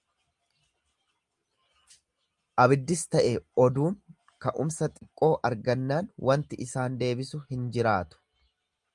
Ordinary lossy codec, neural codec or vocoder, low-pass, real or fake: Opus, 32 kbps; none; 10.8 kHz; real